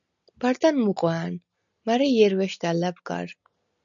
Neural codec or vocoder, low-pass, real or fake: none; 7.2 kHz; real